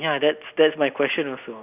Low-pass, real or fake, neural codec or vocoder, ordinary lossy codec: 3.6 kHz; real; none; none